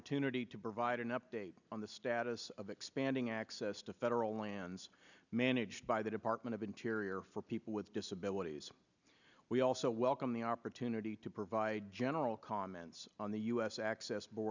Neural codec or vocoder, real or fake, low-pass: none; real; 7.2 kHz